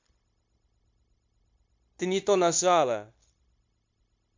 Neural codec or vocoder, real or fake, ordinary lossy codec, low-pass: codec, 16 kHz, 0.9 kbps, LongCat-Audio-Codec; fake; MP3, 48 kbps; 7.2 kHz